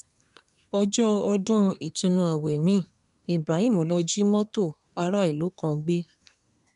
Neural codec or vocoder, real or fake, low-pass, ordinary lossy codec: codec, 24 kHz, 1 kbps, SNAC; fake; 10.8 kHz; none